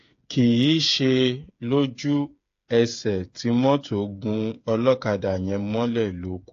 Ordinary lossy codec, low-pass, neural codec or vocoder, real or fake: AAC, 48 kbps; 7.2 kHz; codec, 16 kHz, 8 kbps, FreqCodec, smaller model; fake